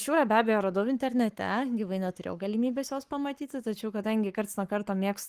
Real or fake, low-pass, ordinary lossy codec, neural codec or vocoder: fake; 14.4 kHz; Opus, 24 kbps; codec, 44.1 kHz, 7.8 kbps, Pupu-Codec